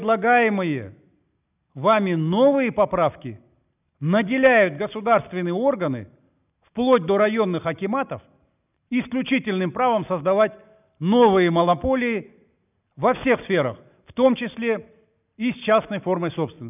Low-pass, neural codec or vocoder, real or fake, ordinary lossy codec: 3.6 kHz; none; real; none